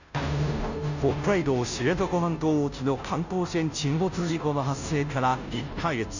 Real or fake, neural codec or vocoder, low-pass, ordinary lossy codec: fake; codec, 16 kHz, 0.5 kbps, FunCodec, trained on Chinese and English, 25 frames a second; 7.2 kHz; none